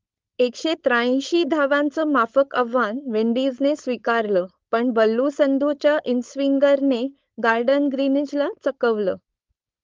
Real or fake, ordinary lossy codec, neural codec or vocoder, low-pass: fake; Opus, 32 kbps; codec, 16 kHz, 4.8 kbps, FACodec; 7.2 kHz